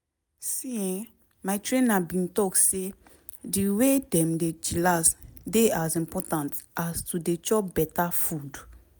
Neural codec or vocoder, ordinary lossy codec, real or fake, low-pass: none; none; real; none